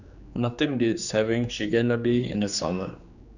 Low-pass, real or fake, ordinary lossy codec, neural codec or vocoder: 7.2 kHz; fake; none; codec, 16 kHz, 2 kbps, X-Codec, HuBERT features, trained on general audio